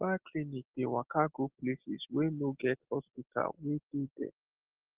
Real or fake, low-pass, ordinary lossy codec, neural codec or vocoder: real; 3.6 kHz; Opus, 16 kbps; none